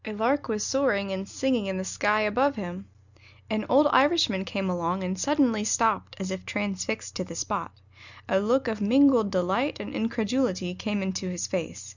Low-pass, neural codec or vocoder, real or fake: 7.2 kHz; none; real